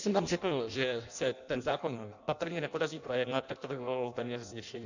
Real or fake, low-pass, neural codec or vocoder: fake; 7.2 kHz; codec, 16 kHz in and 24 kHz out, 0.6 kbps, FireRedTTS-2 codec